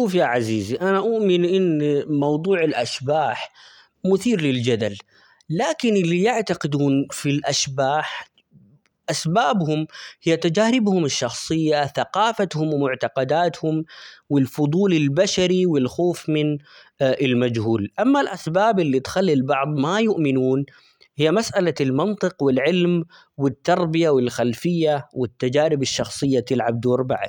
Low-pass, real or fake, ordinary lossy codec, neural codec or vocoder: 19.8 kHz; real; none; none